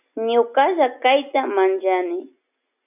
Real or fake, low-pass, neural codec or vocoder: real; 3.6 kHz; none